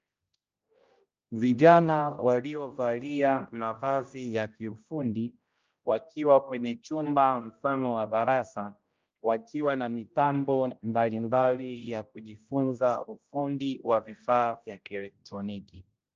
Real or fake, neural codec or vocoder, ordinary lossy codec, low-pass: fake; codec, 16 kHz, 0.5 kbps, X-Codec, HuBERT features, trained on general audio; Opus, 24 kbps; 7.2 kHz